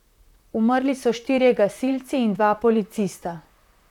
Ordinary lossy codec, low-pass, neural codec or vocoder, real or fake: none; 19.8 kHz; vocoder, 44.1 kHz, 128 mel bands, Pupu-Vocoder; fake